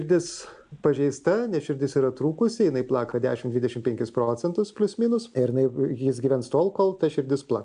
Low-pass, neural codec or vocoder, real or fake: 9.9 kHz; none; real